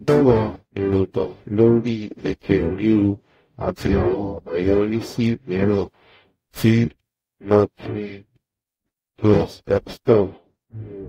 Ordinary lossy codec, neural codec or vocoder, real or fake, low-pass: AAC, 48 kbps; codec, 44.1 kHz, 0.9 kbps, DAC; fake; 19.8 kHz